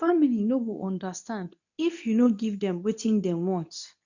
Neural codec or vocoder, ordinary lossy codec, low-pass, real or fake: codec, 24 kHz, 0.9 kbps, WavTokenizer, medium speech release version 2; none; 7.2 kHz; fake